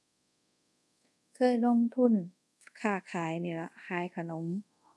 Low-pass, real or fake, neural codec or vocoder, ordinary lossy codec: none; fake; codec, 24 kHz, 0.5 kbps, DualCodec; none